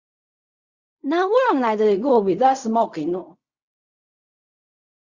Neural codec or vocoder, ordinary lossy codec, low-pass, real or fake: codec, 16 kHz in and 24 kHz out, 0.4 kbps, LongCat-Audio-Codec, fine tuned four codebook decoder; Opus, 64 kbps; 7.2 kHz; fake